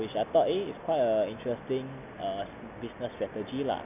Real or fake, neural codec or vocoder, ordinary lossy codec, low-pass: real; none; none; 3.6 kHz